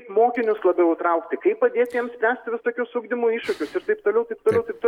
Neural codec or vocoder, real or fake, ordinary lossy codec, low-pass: none; real; MP3, 96 kbps; 19.8 kHz